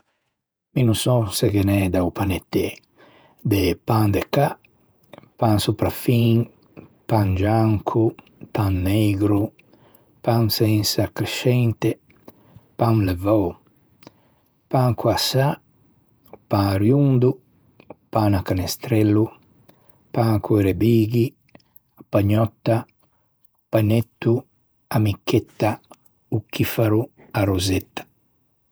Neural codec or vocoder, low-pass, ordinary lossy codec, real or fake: vocoder, 48 kHz, 128 mel bands, Vocos; none; none; fake